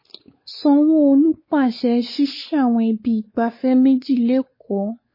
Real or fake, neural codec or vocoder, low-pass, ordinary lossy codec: fake; codec, 16 kHz, 4 kbps, X-Codec, WavLM features, trained on Multilingual LibriSpeech; 5.4 kHz; MP3, 24 kbps